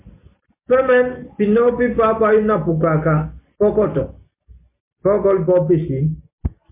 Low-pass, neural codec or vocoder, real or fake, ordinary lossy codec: 3.6 kHz; none; real; MP3, 24 kbps